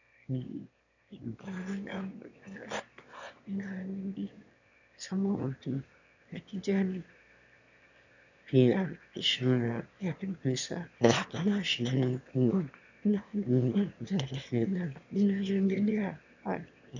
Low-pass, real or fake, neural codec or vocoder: 7.2 kHz; fake; autoencoder, 22.05 kHz, a latent of 192 numbers a frame, VITS, trained on one speaker